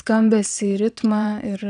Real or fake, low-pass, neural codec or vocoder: fake; 9.9 kHz; vocoder, 22.05 kHz, 80 mel bands, WaveNeXt